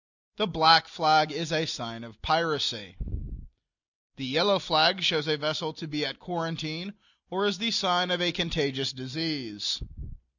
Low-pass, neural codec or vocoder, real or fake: 7.2 kHz; none; real